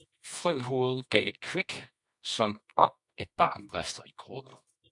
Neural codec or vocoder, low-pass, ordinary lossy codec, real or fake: codec, 24 kHz, 0.9 kbps, WavTokenizer, medium music audio release; 10.8 kHz; MP3, 64 kbps; fake